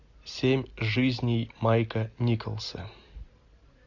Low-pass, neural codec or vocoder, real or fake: 7.2 kHz; none; real